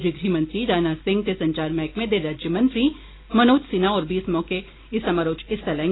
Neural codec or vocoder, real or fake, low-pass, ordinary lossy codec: none; real; 7.2 kHz; AAC, 16 kbps